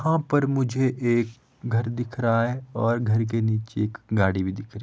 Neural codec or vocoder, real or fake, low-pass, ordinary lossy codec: none; real; none; none